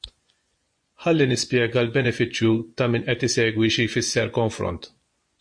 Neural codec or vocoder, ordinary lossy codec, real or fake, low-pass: vocoder, 24 kHz, 100 mel bands, Vocos; MP3, 48 kbps; fake; 9.9 kHz